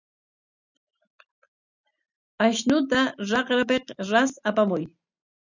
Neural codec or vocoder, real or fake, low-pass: none; real; 7.2 kHz